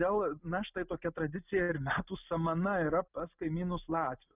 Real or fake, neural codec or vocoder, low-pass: real; none; 3.6 kHz